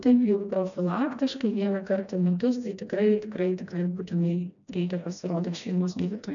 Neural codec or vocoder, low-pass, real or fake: codec, 16 kHz, 1 kbps, FreqCodec, smaller model; 7.2 kHz; fake